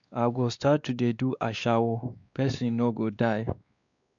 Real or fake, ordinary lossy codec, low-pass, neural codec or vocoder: fake; none; 7.2 kHz; codec, 16 kHz, 2 kbps, X-Codec, WavLM features, trained on Multilingual LibriSpeech